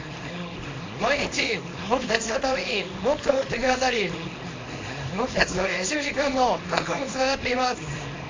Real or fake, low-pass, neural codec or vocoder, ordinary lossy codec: fake; 7.2 kHz; codec, 24 kHz, 0.9 kbps, WavTokenizer, small release; AAC, 32 kbps